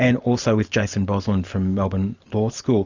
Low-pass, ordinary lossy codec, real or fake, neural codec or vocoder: 7.2 kHz; Opus, 64 kbps; real; none